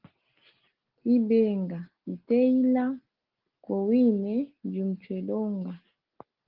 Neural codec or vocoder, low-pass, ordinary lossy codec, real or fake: none; 5.4 kHz; Opus, 16 kbps; real